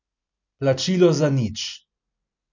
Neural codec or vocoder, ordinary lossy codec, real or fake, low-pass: none; none; real; 7.2 kHz